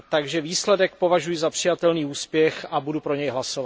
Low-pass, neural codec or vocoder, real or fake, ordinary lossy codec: none; none; real; none